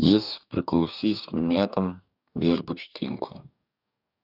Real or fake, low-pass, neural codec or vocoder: fake; 5.4 kHz; codec, 44.1 kHz, 2.6 kbps, DAC